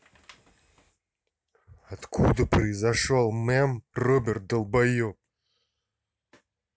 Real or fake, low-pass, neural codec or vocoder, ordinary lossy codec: real; none; none; none